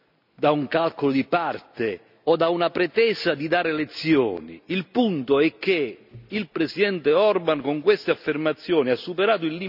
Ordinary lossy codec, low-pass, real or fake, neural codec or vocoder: none; 5.4 kHz; real; none